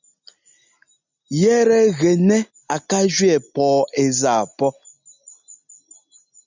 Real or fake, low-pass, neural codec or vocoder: real; 7.2 kHz; none